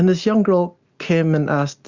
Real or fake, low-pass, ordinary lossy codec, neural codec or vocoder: real; 7.2 kHz; Opus, 64 kbps; none